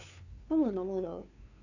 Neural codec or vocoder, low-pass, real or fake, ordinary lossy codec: codec, 16 kHz, 2 kbps, FunCodec, trained on LibriTTS, 25 frames a second; 7.2 kHz; fake; none